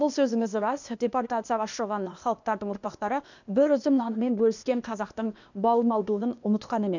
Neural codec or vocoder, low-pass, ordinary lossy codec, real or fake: codec, 16 kHz, 0.8 kbps, ZipCodec; 7.2 kHz; none; fake